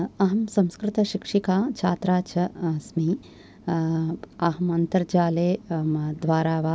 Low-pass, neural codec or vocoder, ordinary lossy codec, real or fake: none; none; none; real